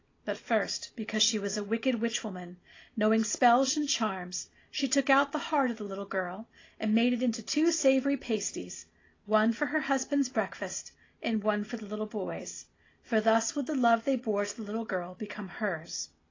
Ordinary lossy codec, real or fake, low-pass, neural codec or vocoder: AAC, 32 kbps; real; 7.2 kHz; none